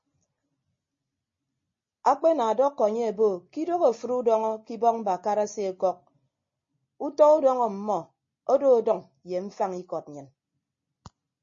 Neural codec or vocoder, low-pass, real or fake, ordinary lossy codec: none; 7.2 kHz; real; MP3, 32 kbps